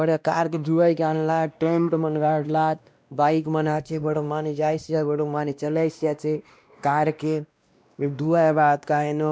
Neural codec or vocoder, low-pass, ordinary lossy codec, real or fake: codec, 16 kHz, 1 kbps, X-Codec, WavLM features, trained on Multilingual LibriSpeech; none; none; fake